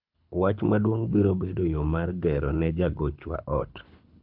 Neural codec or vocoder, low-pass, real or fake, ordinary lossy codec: codec, 24 kHz, 6 kbps, HILCodec; 5.4 kHz; fake; none